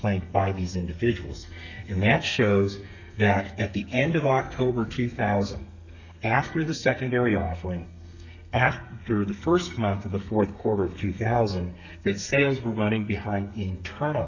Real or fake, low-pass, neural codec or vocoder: fake; 7.2 kHz; codec, 32 kHz, 1.9 kbps, SNAC